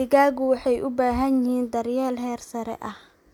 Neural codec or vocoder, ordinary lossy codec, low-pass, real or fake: none; none; 19.8 kHz; real